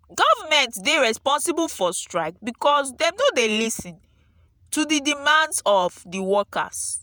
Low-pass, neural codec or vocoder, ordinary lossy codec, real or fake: none; vocoder, 48 kHz, 128 mel bands, Vocos; none; fake